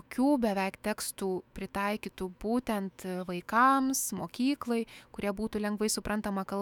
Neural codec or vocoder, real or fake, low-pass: autoencoder, 48 kHz, 128 numbers a frame, DAC-VAE, trained on Japanese speech; fake; 19.8 kHz